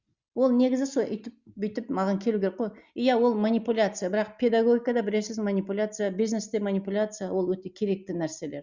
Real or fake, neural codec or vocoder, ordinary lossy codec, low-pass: real; none; Opus, 64 kbps; 7.2 kHz